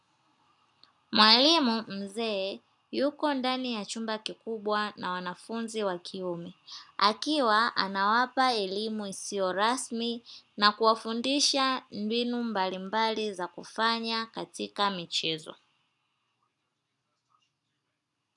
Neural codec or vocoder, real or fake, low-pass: none; real; 10.8 kHz